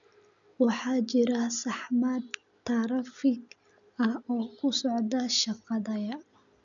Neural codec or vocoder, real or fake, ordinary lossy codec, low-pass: none; real; none; 7.2 kHz